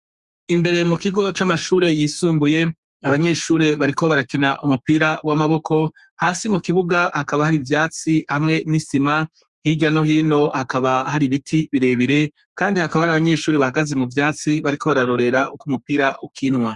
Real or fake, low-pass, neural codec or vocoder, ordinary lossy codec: fake; 10.8 kHz; codec, 32 kHz, 1.9 kbps, SNAC; Opus, 64 kbps